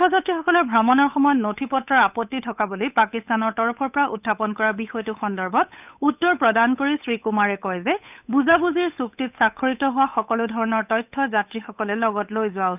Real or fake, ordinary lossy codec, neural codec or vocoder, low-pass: fake; none; codec, 16 kHz, 8 kbps, FunCodec, trained on Chinese and English, 25 frames a second; 3.6 kHz